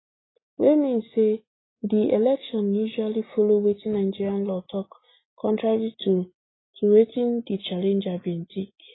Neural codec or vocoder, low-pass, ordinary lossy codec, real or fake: none; 7.2 kHz; AAC, 16 kbps; real